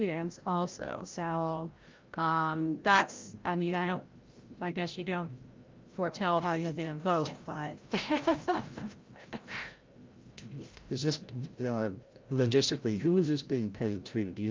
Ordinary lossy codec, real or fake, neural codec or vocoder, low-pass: Opus, 24 kbps; fake; codec, 16 kHz, 0.5 kbps, FreqCodec, larger model; 7.2 kHz